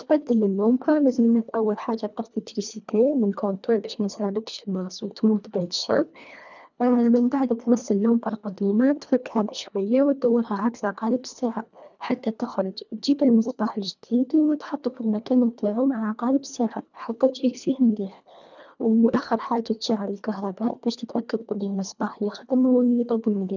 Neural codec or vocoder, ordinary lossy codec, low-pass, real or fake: codec, 24 kHz, 1.5 kbps, HILCodec; none; 7.2 kHz; fake